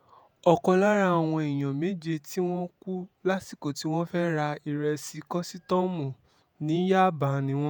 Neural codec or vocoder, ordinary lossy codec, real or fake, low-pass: vocoder, 48 kHz, 128 mel bands, Vocos; none; fake; none